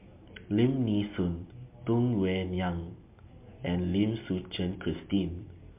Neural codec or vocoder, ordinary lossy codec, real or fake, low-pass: none; MP3, 32 kbps; real; 3.6 kHz